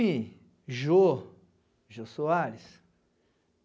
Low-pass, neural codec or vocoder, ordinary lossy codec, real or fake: none; none; none; real